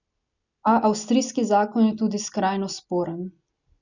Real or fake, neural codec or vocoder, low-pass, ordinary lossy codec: real; none; 7.2 kHz; none